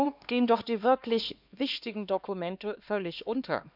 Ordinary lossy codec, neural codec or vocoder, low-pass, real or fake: none; codec, 16 kHz, 2 kbps, X-Codec, HuBERT features, trained on LibriSpeech; 5.4 kHz; fake